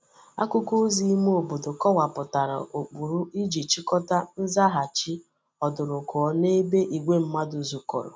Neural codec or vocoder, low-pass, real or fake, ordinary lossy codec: none; none; real; none